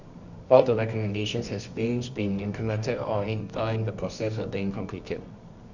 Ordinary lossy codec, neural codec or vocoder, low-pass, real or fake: Opus, 64 kbps; codec, 24 kHz, 0.9 kbps, WavTokenizer, medium music audio release; 7.2 kHz; fake